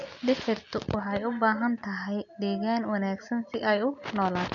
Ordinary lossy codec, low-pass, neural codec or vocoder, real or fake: none; 7.2 kHz; none; real